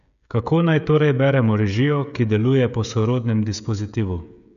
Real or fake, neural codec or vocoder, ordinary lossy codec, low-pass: fake; codec, 16 kHz, 16 kbps, FreqCodec, smaller model; none; 7.2 kHz